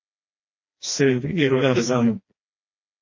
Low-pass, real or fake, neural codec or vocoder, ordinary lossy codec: 7.2 kHz; fake; codec, 16 kHz, 1 kbps, FreqCodec, smaller model; MP3, 32 kbps